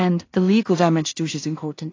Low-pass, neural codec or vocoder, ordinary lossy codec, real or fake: 7.2 kHz; codec, 16 kHz in and 24 kHz out, 0.4 kbps, LongCat-Audio-Codec, two codebook decoder; AAC, 32 kbps; fake